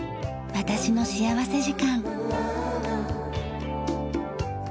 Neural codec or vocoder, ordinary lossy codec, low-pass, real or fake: none; none; none; real